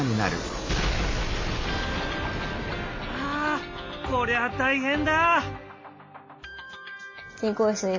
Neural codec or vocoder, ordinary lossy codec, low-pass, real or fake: none; MP3, 32 kbps; 7.2 kHz; real